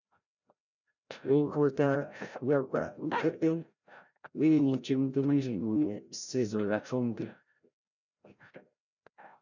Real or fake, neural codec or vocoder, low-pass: fake; codec, 16 kHz, 0.5 kbps, FreqCodec, larger model; 7.2 kHz